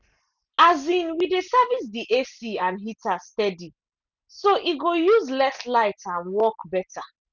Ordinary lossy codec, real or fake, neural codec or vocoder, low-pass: none; real; none; 7.2 kHz